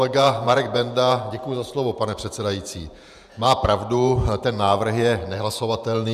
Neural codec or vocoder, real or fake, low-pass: vocoder, 48 kHz, 128 mel bands, Vocos; fake; 14.4 kHz